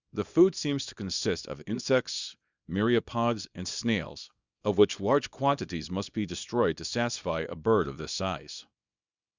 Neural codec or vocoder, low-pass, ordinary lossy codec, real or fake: codec, 24 kHz, 0.9 kbps, WavTokenizer, small release; 7.2 kHz; Opus, 64 kbps; fake